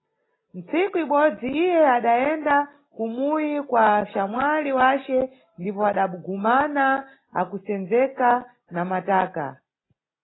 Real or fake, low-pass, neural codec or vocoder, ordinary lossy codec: real; 7.2 kHz; none; AAC, 16 kbps